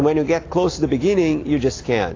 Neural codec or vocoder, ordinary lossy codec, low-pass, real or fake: none; AAC, 32 kbps; 7.2 kHz; real